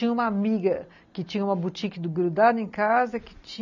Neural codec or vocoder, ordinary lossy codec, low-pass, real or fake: none; none; 7.2 kHz; real